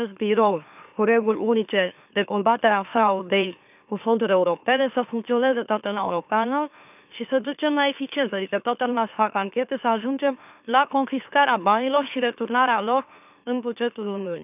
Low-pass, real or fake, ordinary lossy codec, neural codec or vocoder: 3.6 kHz; fake; none; autoencoder, 44.1 kHz, a latent of 192 numbers a frame, MeloTTS